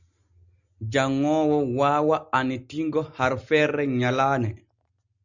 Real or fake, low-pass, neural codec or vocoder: real; 7.2 kHz; none